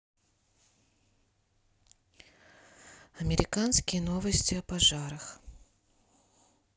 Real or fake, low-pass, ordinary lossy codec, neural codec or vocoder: real; none; none; none